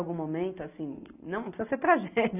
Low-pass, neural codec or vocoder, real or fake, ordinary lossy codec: 3.6 kHz; none; real; none